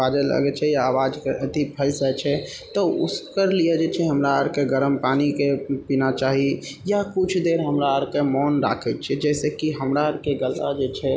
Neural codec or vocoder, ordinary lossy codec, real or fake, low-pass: none; none; real; none